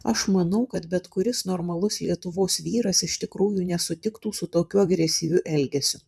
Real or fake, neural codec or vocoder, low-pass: fake; vocoder, 44.1 kHz, 128 mel bands, Pupu-Vocoder; 14.4 kHz